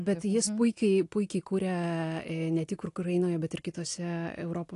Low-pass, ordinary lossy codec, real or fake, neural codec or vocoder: 10.8 kHz; AAC, 48 kbps; real; none